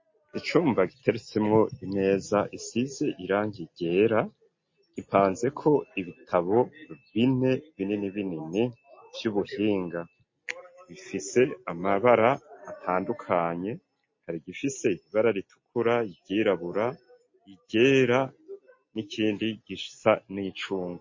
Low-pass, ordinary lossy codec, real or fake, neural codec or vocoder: 7.2 kHz; MP3, 32 kbps; real; none